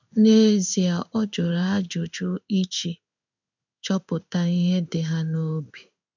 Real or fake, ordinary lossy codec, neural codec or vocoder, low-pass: fake; none; codec, 16 kHz in and 24 kHz out, 1 kbps, XY-Tokenizer; 7.2 kHz